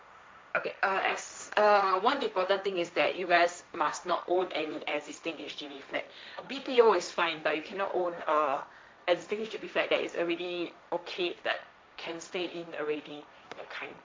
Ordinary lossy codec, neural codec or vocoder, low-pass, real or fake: none; codec, 16 kHz, 1.1 kbps, Voila-Tokenizer; none; fake